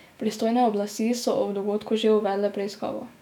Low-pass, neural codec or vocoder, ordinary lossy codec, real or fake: 19.8 kHz; autoencoder, 48 kHz, 128 numbers a frame, DAC-VAE, trained on Japanese speech; none; fake